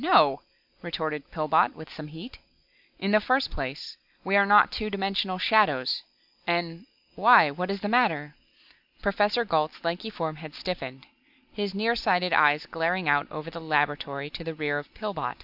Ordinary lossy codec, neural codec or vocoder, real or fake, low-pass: AAC, 48 kbps; none; real; 5.4 kHz